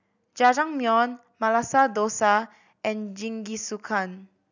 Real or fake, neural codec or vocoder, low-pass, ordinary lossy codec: real; none; 7.2 kHz; none